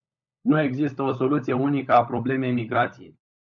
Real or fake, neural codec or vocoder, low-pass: fake; codec, 16 kHz, 16 kbps, FunCodec, trained on LibriTTS, 50 frames a second; 7.2 kHz